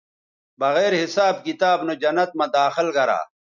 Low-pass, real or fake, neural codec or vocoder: 7.2 kHz; real; none